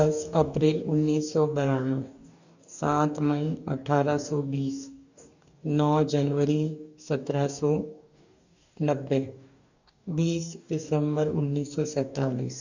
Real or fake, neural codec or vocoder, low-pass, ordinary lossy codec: fake; codec, 44.1 kHz, 2.6 kbps, DAC; 7.2 kHz; none